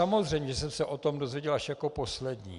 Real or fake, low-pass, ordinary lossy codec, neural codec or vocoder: real; 10.8 kHz; Opus, 64 kbps; none